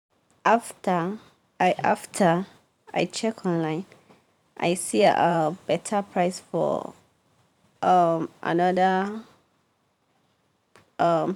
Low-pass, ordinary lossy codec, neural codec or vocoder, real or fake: 19.8 kHz; none; vocoder, 44.1 kHz, 128 mel bands every 512 samples, BigVGAN v2; fake